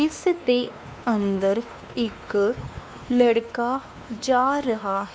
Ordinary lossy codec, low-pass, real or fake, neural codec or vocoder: none; none; fake; codec, 16 kHz, 2 kbps, X-Codec, WavLM features, trained on Multilingual LibriSpeech